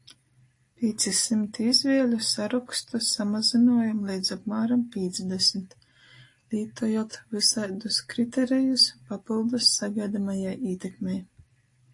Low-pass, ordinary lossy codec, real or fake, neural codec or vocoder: 10.8 kHz; AAC, 48 kbps; real; none